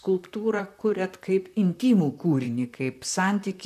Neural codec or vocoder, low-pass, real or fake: vocoder, 44.1 kHz, 128 mel bands, Pupu-Vocoder; 14.4 kHz; fake